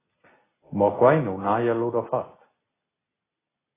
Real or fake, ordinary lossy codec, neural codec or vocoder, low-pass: real; AAC, 16 kbps; none; 3.6 kHz